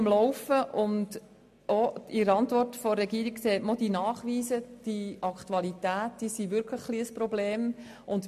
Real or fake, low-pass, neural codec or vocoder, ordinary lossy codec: real; 14.4 kHz; none; MP3, 64 kbps